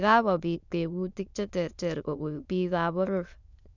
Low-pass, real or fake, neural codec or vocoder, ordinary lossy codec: 7.2 kHz; fake; autoencoder, 22.05 kHz, a latent of 192 numbers a frame, VITS, trained on many speakers; none